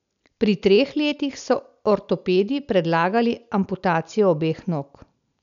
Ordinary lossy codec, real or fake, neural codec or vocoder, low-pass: none; real; none; 7.2 kHz